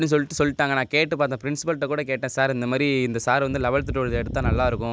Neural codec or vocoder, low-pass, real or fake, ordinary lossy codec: none; none; real; none